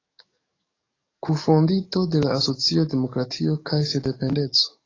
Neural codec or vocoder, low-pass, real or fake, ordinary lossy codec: codec, 44.1 kHz, 7.8 kbps, DAC; 7.2 kHz; fake; AAC, 32 kbps